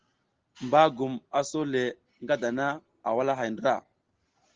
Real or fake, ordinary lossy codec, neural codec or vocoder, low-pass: real; Opus, 16 kbps; none; 7.2 kHz